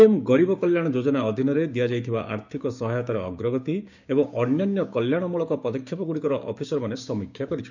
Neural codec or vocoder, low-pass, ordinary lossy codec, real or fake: codec, 16 kHz, 6 kbps, DAC; 7.2 kHz; none; fake